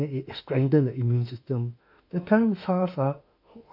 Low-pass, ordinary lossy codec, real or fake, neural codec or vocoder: 5.4 kHz; none; fake; autoencoder, 48 kHz, 32 numbers a frame, DAC-VAE, trained on Japanese speech